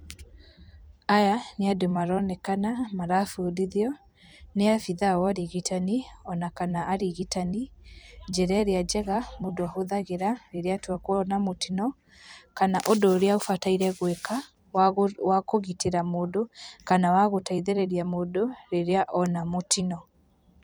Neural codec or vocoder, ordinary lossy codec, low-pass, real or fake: vocoder, 44.1 kHz, 128 mel bands every 256 samples, BigVGAN v2; none; none; fake